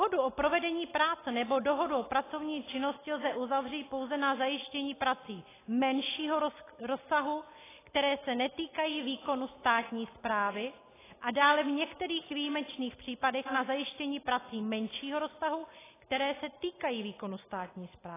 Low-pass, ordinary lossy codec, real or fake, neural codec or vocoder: 3.6 kHz; AAC, 16 kbps; real; none